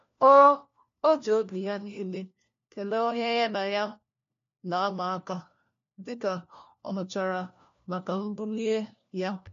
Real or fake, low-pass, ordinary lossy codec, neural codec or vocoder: fake; 7.2 kHz; MP3, 48 kbps; codec, 16 kHz, 1 kbps, FunCodec, trained on LibriTTS, 50 frames a second